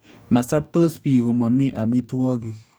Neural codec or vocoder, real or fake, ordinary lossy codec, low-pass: codec, 44.1 kHz, 2.6 kbps, DAC; fake; none; none